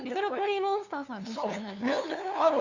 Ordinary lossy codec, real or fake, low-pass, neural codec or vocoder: Opus, 64 kbps; fake; 7.2 kHz; codec, 16 kHz, 2 kbps, FunCodec, trained on LibriTTS, 25 frames a second